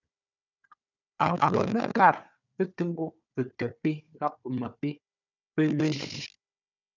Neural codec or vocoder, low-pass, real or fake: codec, 16 kHz, 4 kbps, FunCodec, trained on Chinese and English, 50 frames a second; 7.2 kHz; fake